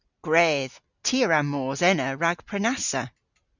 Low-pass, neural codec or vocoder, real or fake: 7.2 kHz; none; real